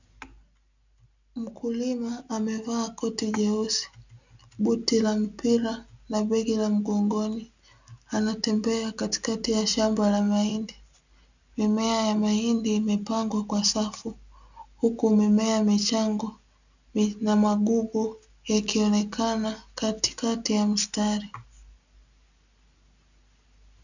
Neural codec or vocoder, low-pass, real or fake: none; 7.2 kHz; real